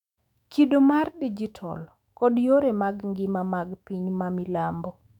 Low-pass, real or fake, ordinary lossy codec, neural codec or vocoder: 19.8 kHz; fake; none; autoencoder, 48 kHz, 128 numbers a frame, DAC-VAE, trained on Japanese speech